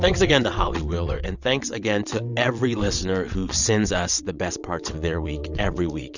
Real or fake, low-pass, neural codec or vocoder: fake; 7.2 kHz; vocoder, 22.05 kHz, 80 mel bands, WaveNeXt